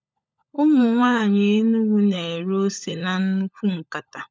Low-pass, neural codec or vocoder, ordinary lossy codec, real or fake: none; codec, 16 kHz, 16 kbps, FunCodec, trained on LibriTTS, 50 frames a second; none; fake